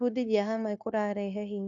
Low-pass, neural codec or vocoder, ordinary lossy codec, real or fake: 7.2 kHz; codec, 16 kHz, 0.9 kbps, LongCat-Audio-Codec; none; fake